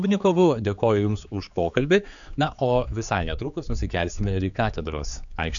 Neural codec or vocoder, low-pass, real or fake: codec, 16 kHz, 4 kbps, X-Codec, HuBERT features, trained on general audio; 7.2 kHz; fake